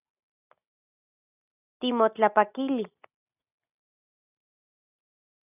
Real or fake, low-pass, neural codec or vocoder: real; 3.6 kHz; none